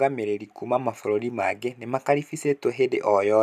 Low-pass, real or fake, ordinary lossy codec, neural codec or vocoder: 14.4 kHz; real; none; none